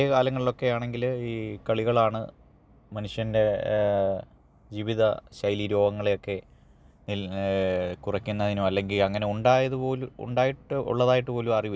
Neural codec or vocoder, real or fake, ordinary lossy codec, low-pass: none; real; none; none